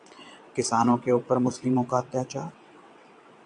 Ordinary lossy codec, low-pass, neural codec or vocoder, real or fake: AAC, 64 kbps; 9.9 kHz; vocoder, 22.05 kHz, 80 mel bands, WaveNeXt; fake